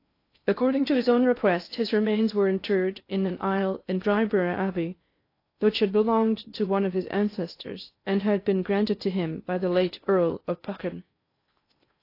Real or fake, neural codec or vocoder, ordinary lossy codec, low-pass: fake; codec, 16 kHz in and 24 kHz out, 0.6 kbps, FocalCodec, streaming, 4096 codes; AAC, 32 kbps; 5.4 kHz